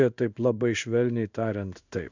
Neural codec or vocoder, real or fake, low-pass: codec, 16 kHz in and 24 kHz out, 1 kbps, XY-Tokenizer; fake; 7.2 kHz